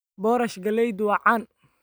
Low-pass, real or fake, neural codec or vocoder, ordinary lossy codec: none; real; none; none